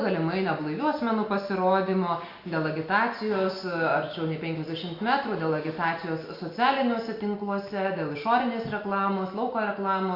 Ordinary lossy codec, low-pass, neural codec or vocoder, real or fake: Opus, 64 kbps; 5.4 kHz; none; real